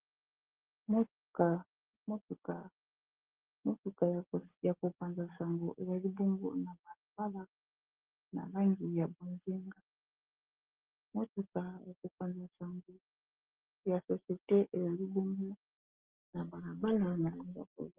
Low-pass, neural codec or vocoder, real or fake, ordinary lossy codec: 3.6 kHz; none; real; Opus, 16 kbps